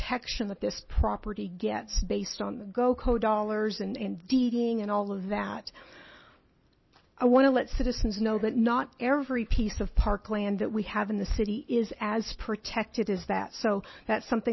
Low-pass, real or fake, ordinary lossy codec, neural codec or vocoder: 7.2 kHz; real; MP3, 24 kbps; none